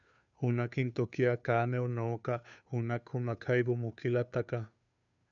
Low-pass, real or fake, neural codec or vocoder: 7.2 kHz; fake; codec, 16 kHz, 2 kbps, FunCodec, trained on Chinese and English, 25 frames a second